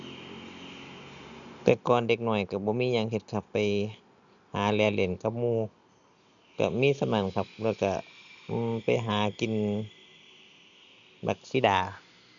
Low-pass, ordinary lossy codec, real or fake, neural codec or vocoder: 7.2 kHz; none; real; none